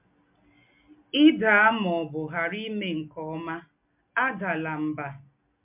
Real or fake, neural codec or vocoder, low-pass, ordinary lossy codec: real; none; 3.6 kHz; MP3, 32 kbps